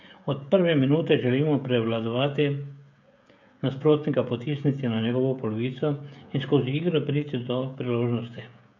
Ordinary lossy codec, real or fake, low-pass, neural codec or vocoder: none; fake; 7.2 kHz; codec, 16 kHz, 16 kbps, FreqCodec, smaller model